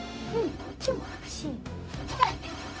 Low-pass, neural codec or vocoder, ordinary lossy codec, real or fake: none; codec, 16 kHz, 0.4 kbps, LongCat-Audio-Codec; none; fake